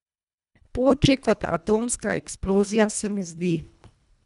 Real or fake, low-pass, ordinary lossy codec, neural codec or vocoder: fake; 10.8 kHz; none; codec, 24 kHz, 1.5 kbps, HILCodec